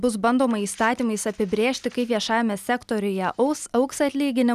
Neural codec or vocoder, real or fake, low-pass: none; real; 14.4 kHz